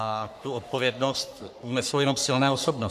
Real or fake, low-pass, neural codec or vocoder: fake; 14.4 kHz; codec, 44.1 kHz, 3.4 kbps, Pupu-Codec